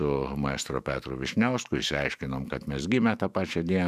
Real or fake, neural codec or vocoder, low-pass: fake; autoencoder, 48 kHz, 128 numbers a frame, DAC-VAE, trained on Japanese speech; 14.4 kHz